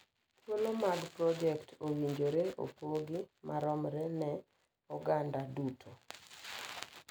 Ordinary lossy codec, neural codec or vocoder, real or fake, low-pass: none; none; real; none